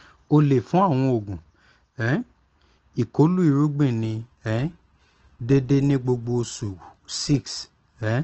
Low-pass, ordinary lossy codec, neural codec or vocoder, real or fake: 7.2 kHz; Opus, 16 kbps; none; real